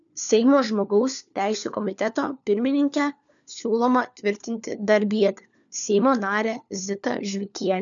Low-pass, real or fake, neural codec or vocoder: 7.2 kHz; fake; codec, 16 kHz, 4 kbps, FunCodec, trained on LibriTTS, 50 frames a second